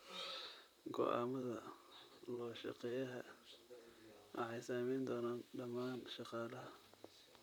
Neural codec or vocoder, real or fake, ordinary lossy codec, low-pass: vocoder, 44.1 kHz, 128 mel bands, Pupu-Vocoder; fake; none; none